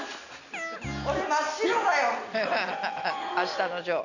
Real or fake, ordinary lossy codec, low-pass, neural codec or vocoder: real; none; 7.2 kHz; none